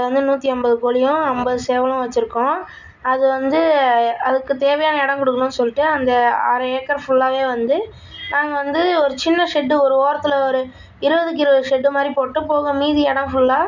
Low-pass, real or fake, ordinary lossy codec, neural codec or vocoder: 7.2 kHz; real; none; none